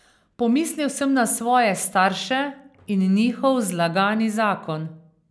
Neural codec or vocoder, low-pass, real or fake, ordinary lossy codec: none; none; real; none